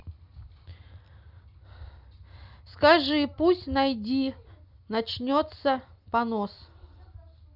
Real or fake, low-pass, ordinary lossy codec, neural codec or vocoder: real; 5.4 kHz; none; none